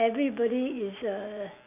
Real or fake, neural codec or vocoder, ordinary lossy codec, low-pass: real; none; none; 3.6 kHz